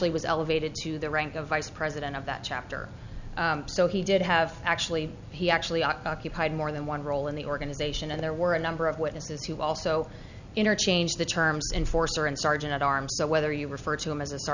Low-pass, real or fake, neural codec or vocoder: 7.2 kHz; real; none